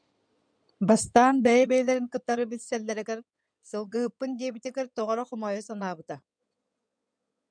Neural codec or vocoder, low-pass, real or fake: codec, 16 kHz in and 24 kHz out, 2.2 kbps, FireRedTTS-2 codec; 9.9 kHz; fake